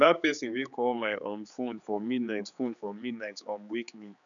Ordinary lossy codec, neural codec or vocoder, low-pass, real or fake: none; codec, 16 kHz, 4 kbps, X-Codec, HuBERT features, trained on general audio; 7.2 kHz; fake